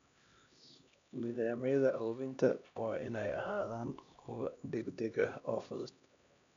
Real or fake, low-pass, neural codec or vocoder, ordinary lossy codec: fake; 7.2 kHz; codec, 16 kHz, 1 kbps, X-Codec, HuBERT features, trained on LibriSpeech; MP3, 64 kbps